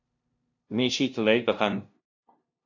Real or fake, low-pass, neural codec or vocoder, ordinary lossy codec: fake; 7.2 kHz; codec, 16 kHz, 0.5 kbps, FunCodec, trained on LibriTTS, 25 frames a second; AAC, 48 kbps